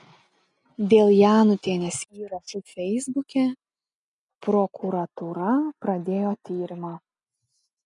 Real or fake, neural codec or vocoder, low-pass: real; none; 10.8 kHz